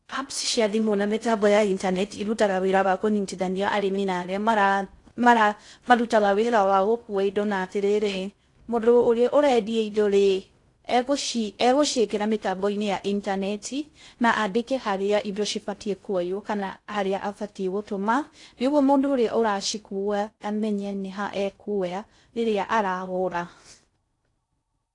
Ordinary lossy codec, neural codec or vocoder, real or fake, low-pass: AAC, 48 kbps; codec, 16 kHz in and 24 kHz out, 0.6 kbps, FocalCodec, streaming, 4096 codes; fake; 10.8 kHz